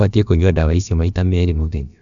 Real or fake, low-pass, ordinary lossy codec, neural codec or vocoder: fake; 7.2 kHz; MP3, 96 kbps; codec, 16 kHz, about 1 kbps, DyCAST, with the encoder's durations